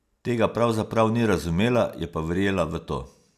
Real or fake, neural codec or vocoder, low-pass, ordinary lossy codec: real; none; 14.4 kHz; AAC, 96 kbps